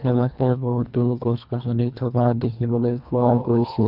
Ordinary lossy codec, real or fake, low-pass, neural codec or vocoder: none; fake; 5.4 kHz; codec, 24 kHz, 1.5 kbps, HILCodec